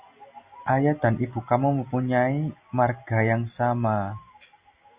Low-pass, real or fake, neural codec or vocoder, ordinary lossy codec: 3.6 kHz; real; none; Opus, 64 kbps